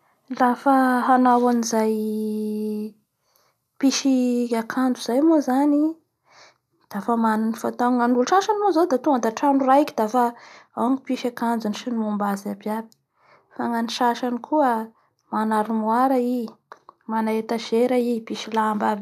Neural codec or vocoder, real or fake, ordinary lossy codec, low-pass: none; real; none; 14.4 kHz